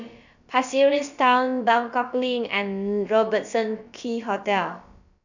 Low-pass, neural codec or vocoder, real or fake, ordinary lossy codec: 7.2 kHz; codec, 16 kHz, about 1 kbps, DyCAST, with the encoder's durations; fake; none